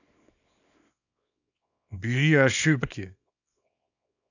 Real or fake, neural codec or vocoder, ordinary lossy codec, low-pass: fake; codec, 24 kHz, 0.9 kbps, WavTokenizer, small release; none; 7.2 kHz